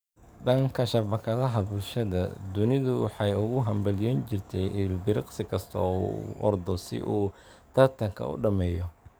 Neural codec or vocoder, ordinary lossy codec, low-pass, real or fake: codec, 44.1 kHz, 7.8 kbps, DAC; none; none; fake